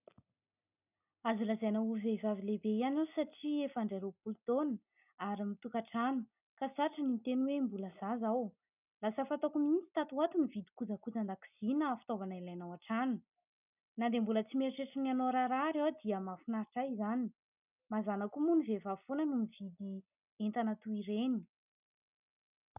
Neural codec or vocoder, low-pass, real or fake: none; 3.6 kHz; real